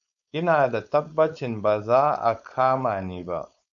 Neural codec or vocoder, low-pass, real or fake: codec, 16 kHz, 4.8 kbps, FACodec; 7.2 kHz; fake